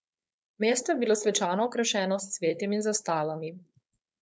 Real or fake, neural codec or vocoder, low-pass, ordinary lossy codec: fake; codec, 16 kHz, 4.8 kbps, FACodec; none; none